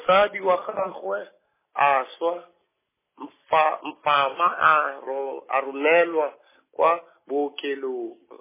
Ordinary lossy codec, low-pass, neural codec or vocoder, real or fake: MP3, 16 kbps; 3.6 kHz; none; real